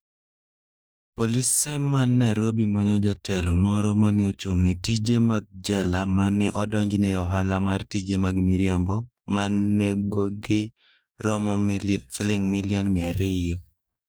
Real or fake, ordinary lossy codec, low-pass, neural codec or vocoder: fake; none; none; codec, 44.1 kHz, 2.6 kbps, DAC